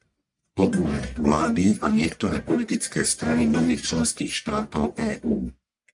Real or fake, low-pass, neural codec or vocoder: fake; 10.8 kHz; codec, 44.1 kHz, 1.7 kbps, Pupu-Codec